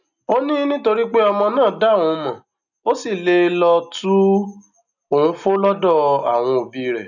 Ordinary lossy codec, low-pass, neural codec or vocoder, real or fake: none; 7.2 kHz; none; real